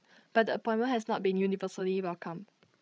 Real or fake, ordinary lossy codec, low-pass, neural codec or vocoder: fake; none; none; codec, 16 kHz, 16 kbps, FreqCodec, larger model